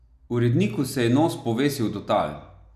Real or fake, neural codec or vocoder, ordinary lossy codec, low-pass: real; none; none; 14.4 kHz